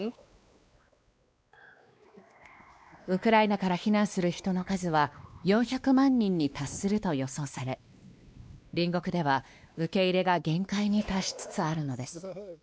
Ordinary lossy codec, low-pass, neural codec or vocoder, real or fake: none; none; codec, 16 kHz, 2 kbps, X-Codec, WavLM features, trained on Multilingual LibriSpeech; fake